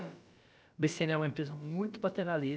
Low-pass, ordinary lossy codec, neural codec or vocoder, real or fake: none; none; codec, 16 kHz, about 1 kbps, DyCAST, with the encoder's durations; fake